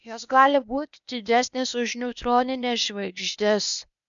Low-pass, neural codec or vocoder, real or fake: 7.2 kHz; codec, 16 kHz, 0.8 kbps, ZipCodec; fake